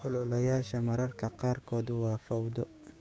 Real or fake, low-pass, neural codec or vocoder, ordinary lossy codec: fake; none; codec, 16 kHz, 6 kbps, DAC; none